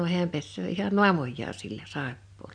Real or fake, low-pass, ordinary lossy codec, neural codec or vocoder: real; 9.9 kHz; none; none